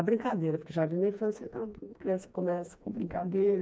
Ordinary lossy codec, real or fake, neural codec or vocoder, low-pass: none; fake; codec, 16 kHz, 2 kbps, FreqCodec, smaller model; none